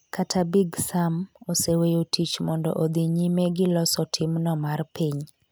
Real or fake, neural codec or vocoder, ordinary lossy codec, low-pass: real; none; none; none